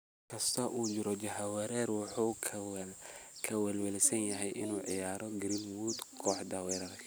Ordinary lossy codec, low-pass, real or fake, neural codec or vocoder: none; none; real; none